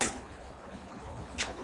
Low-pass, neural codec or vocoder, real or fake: 10.8 kHz; codec, 24 kHz, 3 kbps, HILCodec; fake